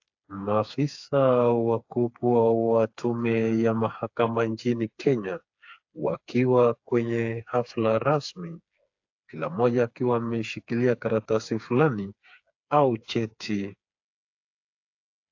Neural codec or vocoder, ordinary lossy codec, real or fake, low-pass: codec, 16 kHz, 4 kbps, FreqCodec, smaller model; AAC, 48 kbps; fake; 7.2 kHz